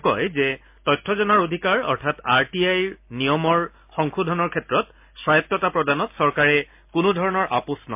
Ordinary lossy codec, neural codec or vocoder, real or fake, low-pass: MP3, 32 kbps; none; real; 3.6 kHz